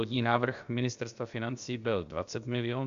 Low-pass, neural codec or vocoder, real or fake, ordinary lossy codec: 7.2 kHz; codec, 16 kHz, about 1 kbps, DyCAST, with the encoder's durations; fake; Opus, 64 kbps